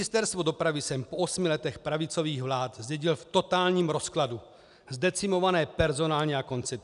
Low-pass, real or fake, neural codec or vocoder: 10.8 kHz; real; none